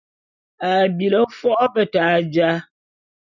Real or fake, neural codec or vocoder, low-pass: real; none; 7.2 kHz